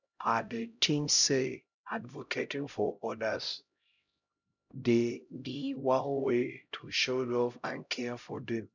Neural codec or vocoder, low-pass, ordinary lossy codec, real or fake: codec, 16 kHz, 0.5 kbps, X-Codec, HuBERT features, trained on LibriSpeech; 7.2 kHz; none; fake